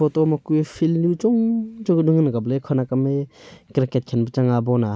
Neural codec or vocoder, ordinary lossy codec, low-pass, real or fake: none; none; none; real